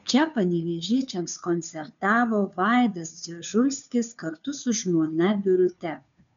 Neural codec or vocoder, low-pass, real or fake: codec, 16 kHz, 2 kbps, FunCodec, trained on Chinese and English, 25 frames a second; 7.2 kHz; fake